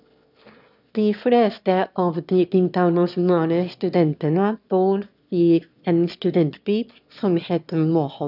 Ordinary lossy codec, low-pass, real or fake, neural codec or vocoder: none; 5.4 kHz; fake; autoencoder, 22.05 kHz, a latent of 192 numbers a frame, VITS, trained on one speaker